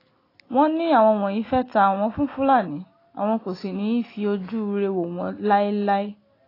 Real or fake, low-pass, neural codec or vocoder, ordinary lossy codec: real; 5.4 kHz; none; AAC, 24 kbps